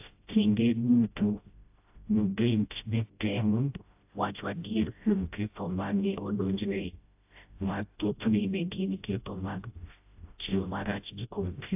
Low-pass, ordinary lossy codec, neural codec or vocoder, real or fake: 3.6 kHz; AAC, 32 kbps; codec, 16 kHz, 0.5 kbps, FreqCodec, smaller model; fake